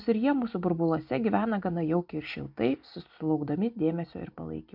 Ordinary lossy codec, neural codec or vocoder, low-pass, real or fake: MP3, 48 kbps; none; 5.4 kHz; real